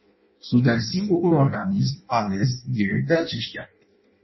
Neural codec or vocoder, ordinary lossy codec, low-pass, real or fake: codec, 16 kHz in and 24 kHz out, 0.6 kbps, FireRedTTS-2 codec; MP3, 24 kbps; 7.2 kHz; fake